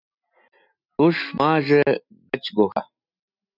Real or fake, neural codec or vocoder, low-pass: real; none; 5.4 kHz